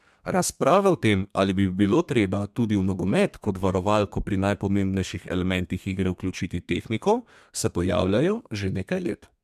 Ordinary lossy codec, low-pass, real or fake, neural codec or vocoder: MP3, 96 kbps; 14.4 kHz; fake; codec, 32 kHz, 1.9 kbps, SNAC